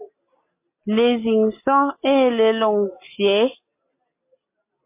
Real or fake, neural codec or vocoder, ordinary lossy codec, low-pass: real; none; MP3, 24 kbps; 3.6 kHz